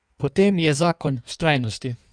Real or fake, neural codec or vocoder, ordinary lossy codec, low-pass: fake; codec, 16 kHz in and 24 kHz out, 1.1 kbps, FireRedTTS-2 codec; none; 9.9 kHz